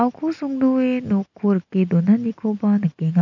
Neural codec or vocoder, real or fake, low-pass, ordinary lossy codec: none; real; 7.2 kHz; none